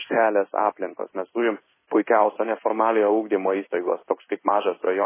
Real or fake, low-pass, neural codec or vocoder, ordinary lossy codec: fake; 3.6 kHz; codec, 16 kHz in and 24 kHz out, 1 kbps, XY-Tokenizer; MP3, 16 kbps